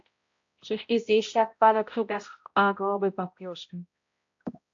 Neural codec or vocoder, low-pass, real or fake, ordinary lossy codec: codec, 16 kHz, 0.5 kbps, X-Codec, HuBERT features, trained on balanced general audio; 7.2 kHz; fake; AAC, 48 kbps